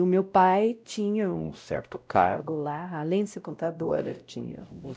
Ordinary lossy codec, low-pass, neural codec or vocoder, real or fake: none; none; codec, 16 kHz, 0.5 kbps, X-Codec, WavLM features, trained on Multilingual LibriSpeech; fake